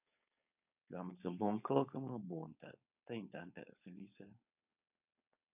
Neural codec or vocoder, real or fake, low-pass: codec, 16 kHz, 4.8 kbps, FACodec; fake; 3.6 kHz